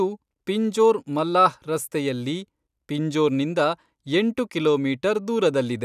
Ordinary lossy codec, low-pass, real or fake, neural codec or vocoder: none; 14.4 kHz; real; none